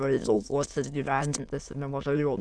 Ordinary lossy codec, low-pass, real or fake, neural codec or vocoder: Opus, 64 kbps; 9.9 kHz; fake; autoencoder, 22.05 kHz, a latent of 192 numbers a frame, VITS, trained on many speakers